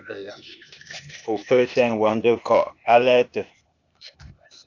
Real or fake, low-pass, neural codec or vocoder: fake; 7.2 kHz; codec, 16 kHz, 0.8 kbps, ZipCodec